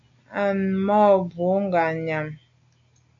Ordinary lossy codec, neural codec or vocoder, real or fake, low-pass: MP3, 64 kbps; none; real; 7.2 kHz